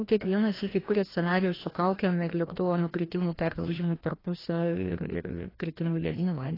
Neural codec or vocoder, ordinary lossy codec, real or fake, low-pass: codec, 16 kHz, 1 kbps, FreqCodec, larger model; AAC, 24 kbps; fake; 5.4 kHz